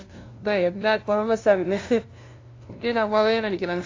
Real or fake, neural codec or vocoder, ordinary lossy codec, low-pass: fake; codec, 16 kHz, 0.5 kbps, FunCodec, trained on LibriTTS, 25 frames a second; AAC, 32 kbps; 7.2 kHz